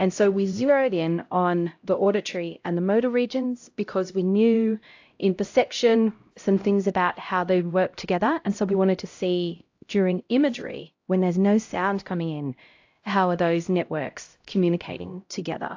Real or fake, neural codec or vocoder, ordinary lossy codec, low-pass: fake; codec, 16 kHz, 0.5 kbps, X-Codec, HuBERT features, trained on LibriSpeech; AAC, 48 kbps; 7.2 kHz